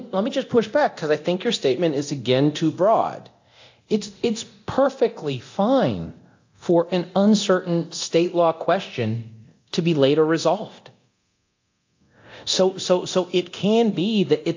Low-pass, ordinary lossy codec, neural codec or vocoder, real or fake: 7.2 kHz; AAC, 48 kbps; codec, 24 kHz, 0.9 kbps, DualCodec; fake